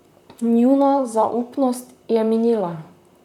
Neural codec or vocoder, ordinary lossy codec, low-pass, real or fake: vocoder, 44.1 kHz, 128 mel bands, Pupu-Vocoder; none; 19.8 kHz; fake